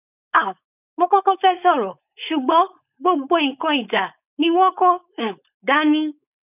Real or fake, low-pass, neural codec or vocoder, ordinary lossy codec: fake; 3.6 kHz; codec, 16 kHz, 4.8 kbps, FACodec; none